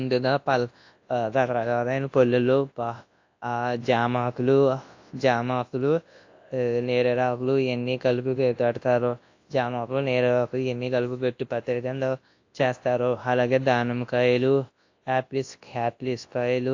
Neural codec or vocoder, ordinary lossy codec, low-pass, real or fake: codec, 24 kHz, 0.9 kbps, WavTokenizer, large speech release; AAC, 48 kbps; 7.2 kHz; fake